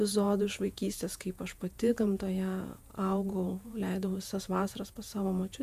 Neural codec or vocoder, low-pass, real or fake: vocoder, 48 kHz, 128 mel bands, Vocos; 14.4 kHz; fake